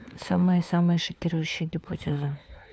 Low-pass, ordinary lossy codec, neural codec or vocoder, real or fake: none; none; codec, 16 kHz, 4 kbps, FunCodec, trained on LibriTTS, 50 frames a second; fake